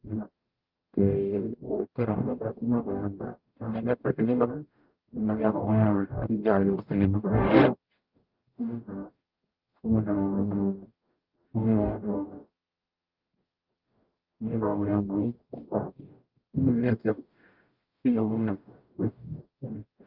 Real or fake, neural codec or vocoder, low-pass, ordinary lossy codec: fake; codec, 44.1 kHz, 0.9 kbps, DAC; 5.4 kHz; Opus, 32 kbps